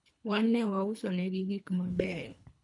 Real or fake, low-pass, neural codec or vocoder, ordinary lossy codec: fake; 10.8 kHz; codec, 24 kHz, 3 kbps, HILCodec; none